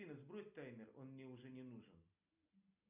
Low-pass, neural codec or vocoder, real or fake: 3.6 kHz; none; real